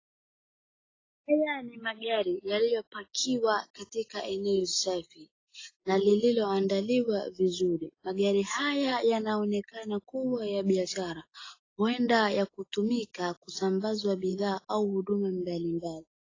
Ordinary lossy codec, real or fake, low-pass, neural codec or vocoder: AAC, 32 kbps; real; 7.2 kHz; none